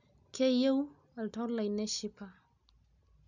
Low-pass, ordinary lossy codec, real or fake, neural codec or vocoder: 7.2 kHz; none; real; none